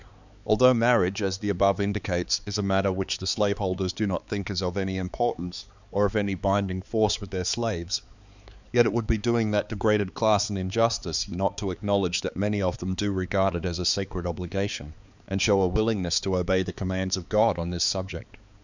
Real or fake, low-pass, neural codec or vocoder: fake; 7.2 kHz; codec, 16 kHz, 4 kbps, X-Codec, HuBERT features, trained on balanced general audio